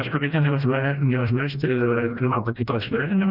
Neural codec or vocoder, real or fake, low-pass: codec, 16 kHz, 1 kbps, FreqCodec, smaller model; fake; 5.4 kHz